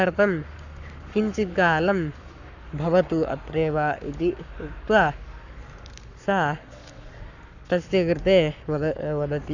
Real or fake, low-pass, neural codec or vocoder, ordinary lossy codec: fake; 7.2 kHz; codec, 44.1 kHz, 7.8 kbps, Pupu-Codec; none